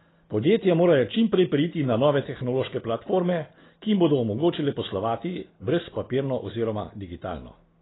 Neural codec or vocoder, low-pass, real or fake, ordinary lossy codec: none; 7.2 kHz; real; AAC, 16 kbps